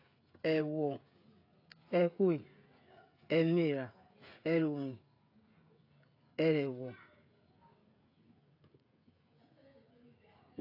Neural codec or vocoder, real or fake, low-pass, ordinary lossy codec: codec, 16 kHz, 16 kbps, FreqCodec, smaller model; fake; 5.4 kHz; AAC, 32 kbps